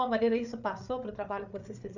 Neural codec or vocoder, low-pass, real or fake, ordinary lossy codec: codec, 16 kHz, 8 kbps, FreqCodec, larger model; 7.2 kHz; fake; none